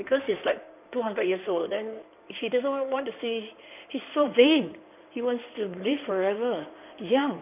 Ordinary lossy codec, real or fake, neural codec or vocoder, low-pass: none; fake; codec, 16 kHz in and 24 kHz out, 2.2 kbps, FireRedTTS-2 codec; 3.6 kHz